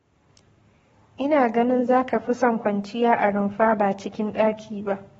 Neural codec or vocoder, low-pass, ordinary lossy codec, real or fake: codec, 44.1 kHz, 7.8 kbps, Pupu-Codec; 19.8 kHz; AAC, 24 kbps; fake